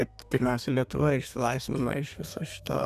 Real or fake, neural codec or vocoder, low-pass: fake; codec, 32 kHz, 1.9 kbps, SNAC; 14.4 kHz